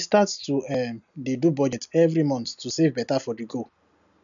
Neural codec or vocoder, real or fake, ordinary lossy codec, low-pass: none; real; none; 7.2 kHz